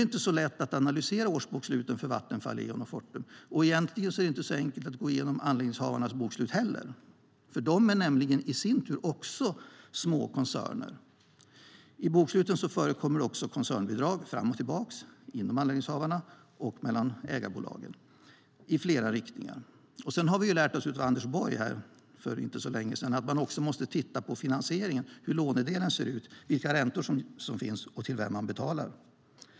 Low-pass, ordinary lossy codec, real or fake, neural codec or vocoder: none; none; real; none